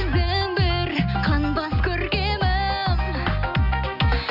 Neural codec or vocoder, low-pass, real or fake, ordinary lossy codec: none; 5.4 kHz; real; none